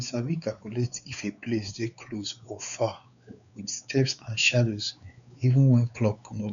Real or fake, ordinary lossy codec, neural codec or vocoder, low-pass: fake; none; codec, 16 kHz, 4 kbps, X-Codec, WavLM features, trained on Multilingual LibriSpeech; 7.2 kHz